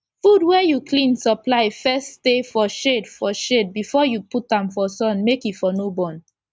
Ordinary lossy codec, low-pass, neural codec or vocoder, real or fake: none; none; none; real